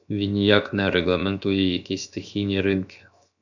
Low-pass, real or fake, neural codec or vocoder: 7.2 kHz; fake; codec, 16 kHz, 0.7 kbps, FocalCodec